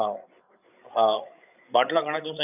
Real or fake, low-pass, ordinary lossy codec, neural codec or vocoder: fake; 3.6 kHz; none; codec, 16 kHz, 8 kbps, FreqCodec, larger model